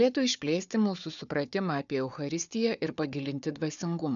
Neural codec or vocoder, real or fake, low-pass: codec, 16 kHz, 4 kbps, FunCodec, trained on Chinese and English, 50 frames a second; fake; 7.2 kHz